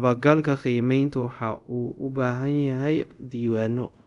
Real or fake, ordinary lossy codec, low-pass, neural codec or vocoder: fake; none; 10.8 kHz; codec, 24 kHz, 0.5 kbps, DualCodec